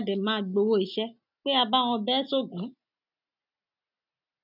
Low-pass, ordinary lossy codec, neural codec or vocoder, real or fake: 5.4 kHz; none; none; real